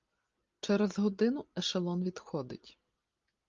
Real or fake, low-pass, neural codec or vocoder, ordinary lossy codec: real; 7.2 kHz; none; Opus, 32 kbps